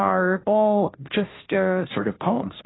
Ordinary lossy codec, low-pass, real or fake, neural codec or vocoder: AAC, 16 kbps; 7.2 kHz; fake; codec, 16 kHz, 0.5 kbps, FunCodec, trained on Chinese and English, 25 frames a second